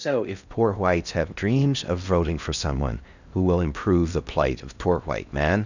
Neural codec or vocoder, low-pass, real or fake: codec, 16 kHz in and 24 kHz out, 0.6 kbps, FocalCodec, streaming, 2048 codes; 7.2 kHz; fake